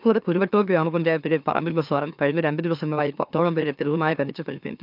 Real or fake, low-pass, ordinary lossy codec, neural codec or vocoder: fake; 5.4 kHz; none; autoencoder, 44.1 kHz, a latent of 192 numbers a frame, MeloTTS